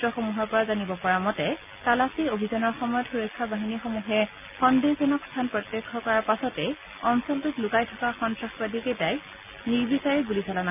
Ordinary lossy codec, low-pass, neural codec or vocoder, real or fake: none; 3.6 kHz; none; real